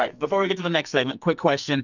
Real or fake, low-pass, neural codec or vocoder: fake; 7.2 kHz; codec, 44.1 kHz, 2.6 kbps, SNAC